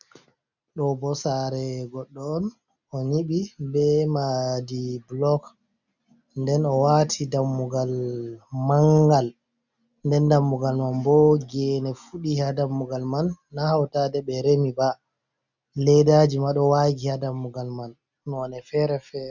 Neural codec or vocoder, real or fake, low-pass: none; real; 7.2 kHz